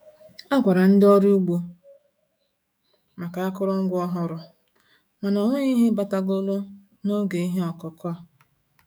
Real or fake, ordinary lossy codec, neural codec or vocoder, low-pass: fake; none; autoencoder, 48 kHz, 128 numbers a frame, DAC-VAE, trained on Japanese speech; none